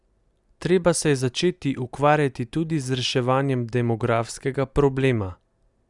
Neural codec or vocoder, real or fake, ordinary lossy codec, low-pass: none; real; Opus, 64 kbps; 10.8 kHz